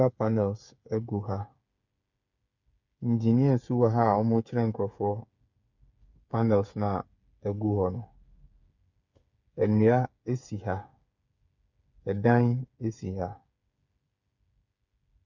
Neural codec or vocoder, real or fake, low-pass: codec, 16 kHz, 8 kbps, FreqCodec, smaller model; fake; 7.2 kHz